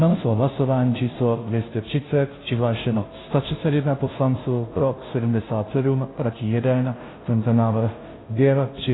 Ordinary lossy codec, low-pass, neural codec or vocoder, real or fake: AAC, 16 kbps; 7.2 kHz; codec, 16 kHz, 0.5 kbps, FunCodec, trained on Chinese and English, 25 frames a second; fake